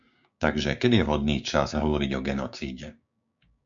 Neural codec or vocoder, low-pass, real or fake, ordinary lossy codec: codec, 16 kHz, 6 kbps, DAC; 7.2 kHz; fake; MP3, 64 kbps